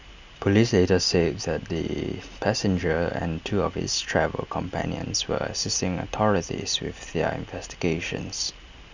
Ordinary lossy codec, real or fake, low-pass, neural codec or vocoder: Opus, 64 kbps; real; 7.2 kHz; none